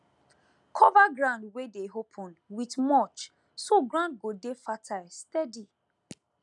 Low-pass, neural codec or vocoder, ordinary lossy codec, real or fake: 10.8 kHz; none; none; real